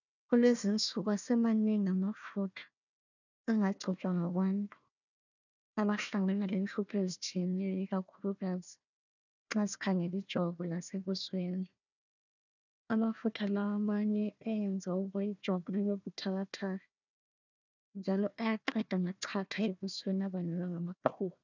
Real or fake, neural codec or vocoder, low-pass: fake; codec, 16 kHz, 1 kbps, FunCodec, trained on Chinese and English, 50 frames a second; 7.2 kHz